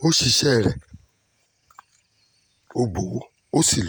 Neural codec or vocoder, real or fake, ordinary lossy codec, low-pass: vocoder, 48 kHz, 128 mel bands, Vocos; fake; none; none